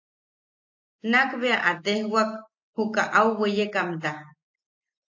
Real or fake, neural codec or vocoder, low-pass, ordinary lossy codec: real; none; 7.2 kHz; AAC, 48 kbps